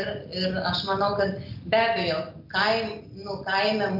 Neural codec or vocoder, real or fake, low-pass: none; real; 5.4 kHz